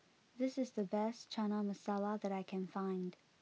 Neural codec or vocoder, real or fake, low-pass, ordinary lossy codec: none; real; none; none